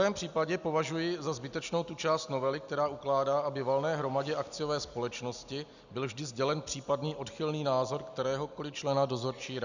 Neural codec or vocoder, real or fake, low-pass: none; real; 7.2 kHz